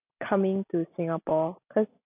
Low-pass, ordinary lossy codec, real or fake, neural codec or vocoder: 3.6 kHz; none; real; none